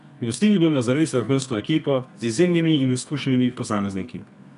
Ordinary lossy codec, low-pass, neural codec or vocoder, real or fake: none; 10.8 kHz; codec, 24 kHz, 0.9 kbps, WavTokenizer, medium music audio release; fake